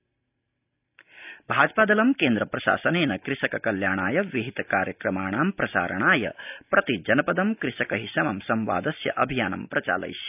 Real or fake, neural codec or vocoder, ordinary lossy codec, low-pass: real; none; none; 3.6 kHz